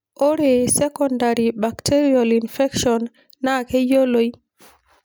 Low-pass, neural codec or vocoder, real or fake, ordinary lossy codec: none; none; real; none